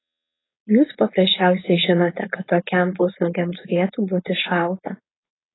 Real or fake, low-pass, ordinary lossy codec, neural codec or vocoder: fake; 7.2 kHz; AAC, 16 kbps; codec, 16 kHz, 4.8 kbps, FACodec